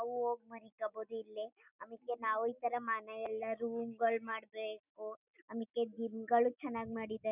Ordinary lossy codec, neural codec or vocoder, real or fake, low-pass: none; none; real; 3.6 kHz